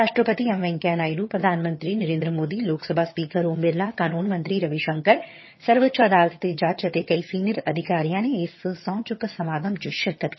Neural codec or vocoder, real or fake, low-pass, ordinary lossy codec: vocoder, 22.05 kHz, 80 mel bands, HiFi-GAN; fake; 7.2 kHz; MP3, 24 kbps